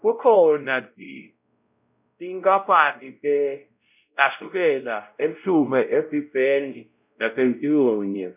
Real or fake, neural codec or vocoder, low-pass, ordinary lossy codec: fake; codec, 16 kHz, 0.5 kbps, X-Codec, WavLM features, trained on Multilingual LibriSpeech; 3.6 kHz; none